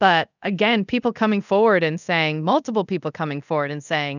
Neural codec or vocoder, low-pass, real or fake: codec, 24 kHz, 0.5 kbps, DualCodec; 7.2 kHz; fake